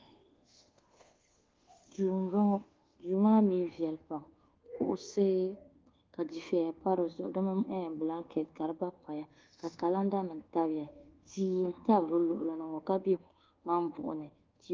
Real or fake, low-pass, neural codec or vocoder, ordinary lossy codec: fake; 7.2 kHz; codec, 24 kHz, 1.2 kbps, DualCodec; Opus, 16 kbps